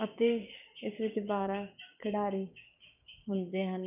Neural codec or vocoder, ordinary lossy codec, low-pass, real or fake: codec, 16 kHz, 6 kbps, DAC; none; 3.6 kHz; fake